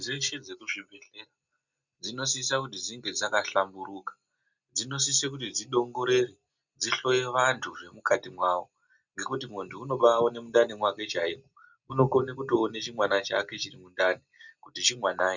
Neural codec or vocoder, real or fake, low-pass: none; real; 7.2 kHz